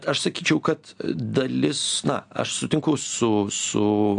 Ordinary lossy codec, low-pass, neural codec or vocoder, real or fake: AAC, 64 kbps; 9.9 kHz; none; real